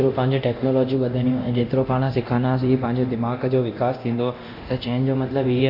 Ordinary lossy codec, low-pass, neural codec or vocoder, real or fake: none; 5.4 kHz; codec, 24 kHz, 0.9 kbps, DualCodec; fake